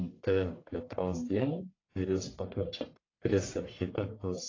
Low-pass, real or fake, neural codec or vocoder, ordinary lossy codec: 7.2 kHz; fake; codec, 44.1 kHz, 1.7 kbps, Pupu-Codec; AAC, 32 kbps